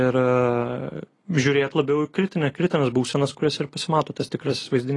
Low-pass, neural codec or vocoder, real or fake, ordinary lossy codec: 10.8 kHz; none; real; AAC, 32 kbps